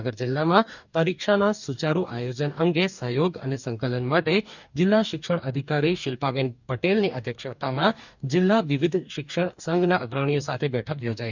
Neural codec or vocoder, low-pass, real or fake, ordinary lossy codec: codec, 44.1 kHz, 2.6 kbps, DAC; 7.2 kHz; fake; none